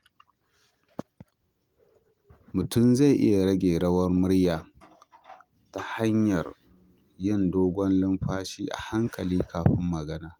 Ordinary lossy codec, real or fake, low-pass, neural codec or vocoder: Opus, 32 kbps; real; 19.8 kHz; none